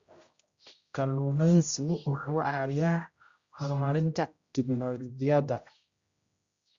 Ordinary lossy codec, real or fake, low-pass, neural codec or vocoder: Opus, 64 kbps; fake; 7.2 kHz; codec, 16 kHz, 0.5 kbps, X-Codec, HuBERT features, trained on general audio